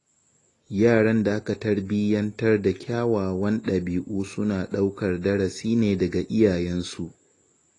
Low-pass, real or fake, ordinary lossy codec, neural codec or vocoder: 10.8 kHz; real; AAC, 32 kbps; none